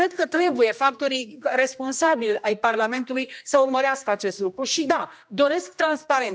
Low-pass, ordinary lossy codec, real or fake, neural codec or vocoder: none; none; fake; codec, 16 kHz, 1 kbps, X-Codec, HuBERT features, trained on general audio